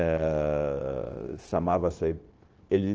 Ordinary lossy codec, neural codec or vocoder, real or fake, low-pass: Opus, 16 kbps; codec, 16 kHz, 0.9 kbps, LongCat-Audio-Codec; fake; 7.2 kHz